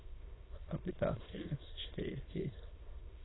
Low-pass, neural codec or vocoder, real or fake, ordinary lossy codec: 7.2 kHz; autoencoder, 22.05 kHz, a latent of 192 numbers a frame, VITS, trained on many speakers; fake; AAC, 16 kbps